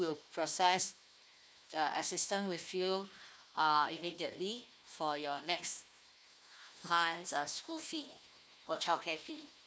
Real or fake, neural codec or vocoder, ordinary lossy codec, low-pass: fake; codec, 16 kHz, 1 kbps, FunCodec, trained on Chinese and English, 50 frames a second; none; none